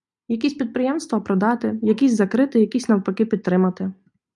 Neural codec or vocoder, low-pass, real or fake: none; 10.8 kHz; real